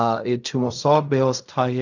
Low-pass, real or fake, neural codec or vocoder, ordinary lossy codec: 7.2 kHz; fake; codec, 16 kHz in and 24 kHz out, 0.4 kbps, LongCat-Audio-Codec, fine tuned four codebook decoder; none